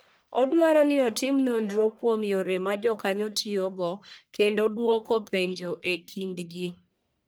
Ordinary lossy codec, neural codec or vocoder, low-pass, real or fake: none; codec, 44.1 kHz, 1.7 kbps, Pupu-Codec; none; fake